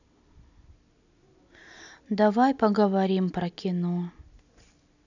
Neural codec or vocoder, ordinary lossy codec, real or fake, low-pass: none; none; real; 7.2 kHz